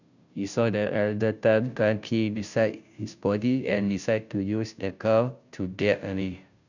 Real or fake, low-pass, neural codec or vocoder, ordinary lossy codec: fake; 7.2 kHz; codec, 16 kHz, 0.5 kbps, FunCodec, trained on Chinese and English, 25 frames a second; none